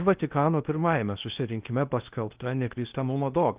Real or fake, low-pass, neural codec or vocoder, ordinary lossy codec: fake; 3.6 kHz; codec, 16 kHz in and 24 kHz out, 0.6 kbps, FocalCodec, streaming, 2048 codes; Opus, 24 kbps